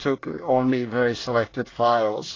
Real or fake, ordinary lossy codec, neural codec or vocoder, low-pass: fake; AAC, 32 kbps; codec, 24 kHz, 1 kbps, SNAC; 7.2 kHz